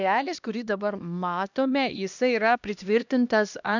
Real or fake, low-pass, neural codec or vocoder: fake; 7.2 kHz; codec, 16 kHz, 1 kbps, X-Codec, HuBERT features, trained on LibriSpeech